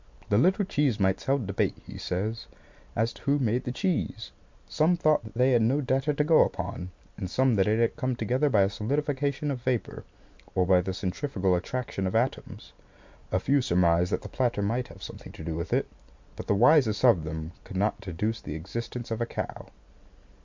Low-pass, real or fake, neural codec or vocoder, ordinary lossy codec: 7.2 kHz; real; none; MP3, 64 kbps